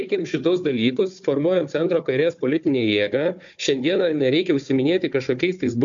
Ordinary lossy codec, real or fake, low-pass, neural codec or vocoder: MP3, 64 kbps; fake; 7.2 kHz; codec, 16 kHz, 4 kbps, FunCodec, trained on Chinese and English, 50 frames a second